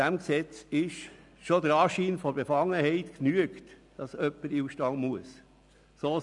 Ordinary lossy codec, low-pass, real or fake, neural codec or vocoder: none; 10.8 kHz; real; none